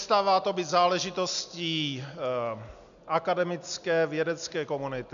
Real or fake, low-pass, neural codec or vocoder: real; 7.2 kHz; none